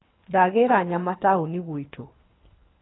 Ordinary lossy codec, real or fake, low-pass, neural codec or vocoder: AAC, 16 kbps; fake; 7.2 kHz; codec, 24 kHz, 3 kbps, HILCodec